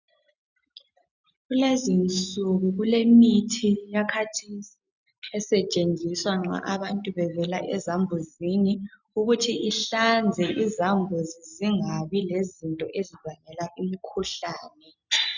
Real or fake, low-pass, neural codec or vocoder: real; 7.2 kHz; none